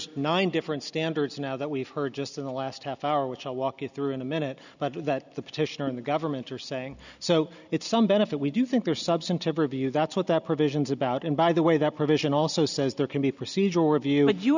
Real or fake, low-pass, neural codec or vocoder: real; 7.2 kHz; none